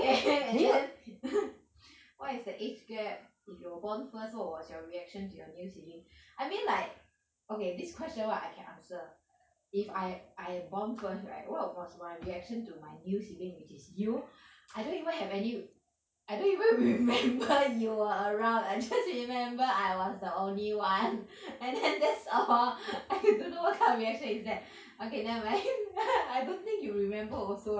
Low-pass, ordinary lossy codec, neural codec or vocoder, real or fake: none; none; none; real